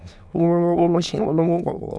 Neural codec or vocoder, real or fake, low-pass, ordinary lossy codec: autoencoder, 22.05 kHz, a latent of 192 numbers a frame, VITS, trained on many speakers; fake; none; none